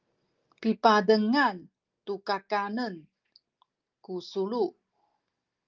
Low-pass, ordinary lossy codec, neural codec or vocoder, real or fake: 7.2 kHz; Opus, 24 kbps; none; real